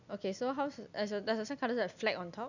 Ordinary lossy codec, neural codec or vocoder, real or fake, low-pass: none; none; real; 7.2 kHz